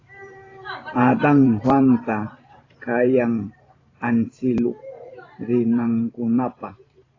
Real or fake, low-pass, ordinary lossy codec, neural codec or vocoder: fake; 7.2 kHz; AAC, 32 kbps; vocoder, 44.1 kHz, 128 mel bands every 512 samples, BigVGAN v2